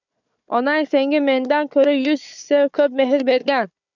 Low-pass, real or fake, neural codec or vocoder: 7.2 kHz; fake; codec, 16 kHz, 4 kbps, FunCodec, trained on Chinese and English, 50 frames a second